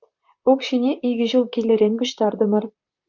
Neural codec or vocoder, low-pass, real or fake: vocoder, 44.1 kHz, 128 mel bands, Pupu-Vocoder; 7.2 kHz; fake